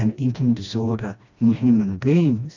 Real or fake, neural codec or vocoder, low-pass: fake; codec, 16 kHz, 1 kbps, FreqCodec, smaller model; 7.2 kHz